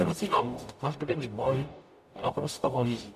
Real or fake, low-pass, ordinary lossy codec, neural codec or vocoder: fake; 14.4 kHz; AAC, 96 kbps; codec, 44.1 kHz, 0.9 kbps, DAC